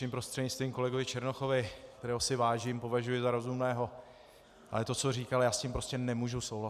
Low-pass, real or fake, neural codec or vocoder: 14.4 kHz; real; none